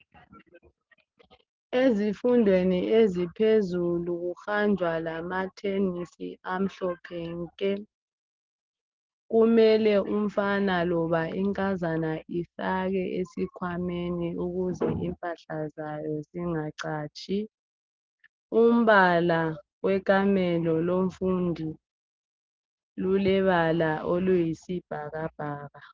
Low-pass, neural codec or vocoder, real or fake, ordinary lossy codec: 7.2 kHz; none; real; Opus, 16 kbps